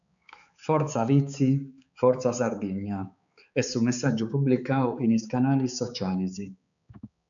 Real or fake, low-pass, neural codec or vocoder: fake; 7.2 kHz; codec, 16 kHz, 4 kbps, X-Codec, HuBERT features, trained on balanced general audio